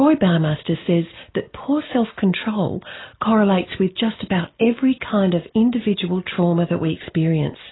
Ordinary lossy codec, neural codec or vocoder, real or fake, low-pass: AAC, 16 kbps; none; real; 7.2 kHz